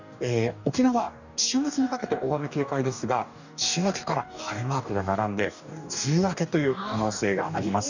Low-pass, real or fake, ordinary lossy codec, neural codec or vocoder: 7.2 kHz; fake; none; codec, 44.1 kHz, 2.6 kbps, DAC